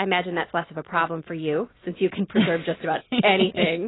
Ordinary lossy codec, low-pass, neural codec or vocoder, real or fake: AAC, 16 kbps; 7.2 kHz; none; real